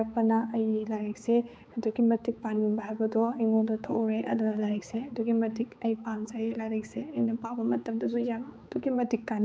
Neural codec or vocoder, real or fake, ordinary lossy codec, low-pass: codec, 16 kHz, 4 kbps, X-Codec, HuBERT features, trained on balanced general audio; fake; none; none